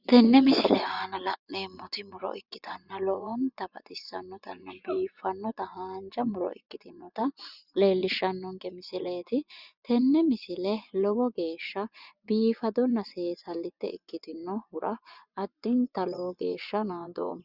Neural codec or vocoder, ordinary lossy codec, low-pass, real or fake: vocoder, 24 kHz, 100 mel bands, Vocos; Opus, 64 kbps; 5.4 kHz; fake